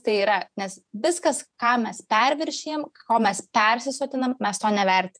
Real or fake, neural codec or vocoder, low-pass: fake; vocoder, 48 kHz, 128 mel bands, Vocos; 9.9 kHz